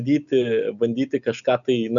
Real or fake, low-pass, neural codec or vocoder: real; 7.2 kHz; none